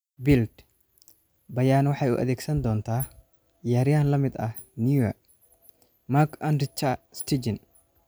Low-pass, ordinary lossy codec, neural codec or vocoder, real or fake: none; none; none; real